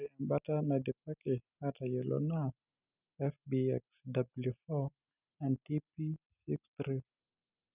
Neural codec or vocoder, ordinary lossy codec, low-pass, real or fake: none; none; 3.6 kHz; real